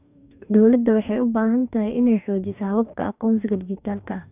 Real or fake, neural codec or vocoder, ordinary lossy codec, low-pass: fake; codec, 44.1 kHz, 2.6 kbps, DAC; none; 3.6 kHz